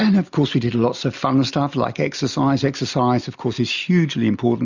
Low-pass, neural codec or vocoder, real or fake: 7.2 kHz; none; real